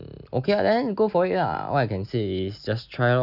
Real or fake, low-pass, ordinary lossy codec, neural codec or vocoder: real; 5.4 kHz; none; none